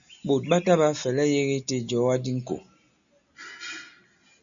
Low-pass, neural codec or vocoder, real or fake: 7.2 kHz; none; real